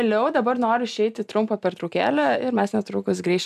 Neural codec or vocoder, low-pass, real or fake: none; 14.4 kHz; real